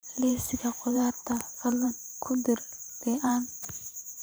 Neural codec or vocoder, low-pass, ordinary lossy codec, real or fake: vocoder, 44.1 kHz, 128 mel bands every 512 samples, BigVGAN v2; none; none; fake